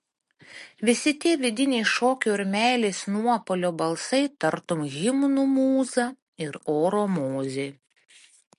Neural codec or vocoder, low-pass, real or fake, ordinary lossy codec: none; 14.4 kHz; real; MP3, 48 kbps